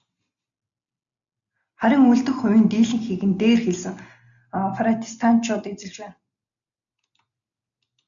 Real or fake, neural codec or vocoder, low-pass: real; none; 7.2 kHz